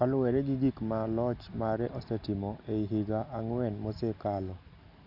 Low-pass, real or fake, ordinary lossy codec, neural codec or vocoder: 5.4 kHz; real; none; none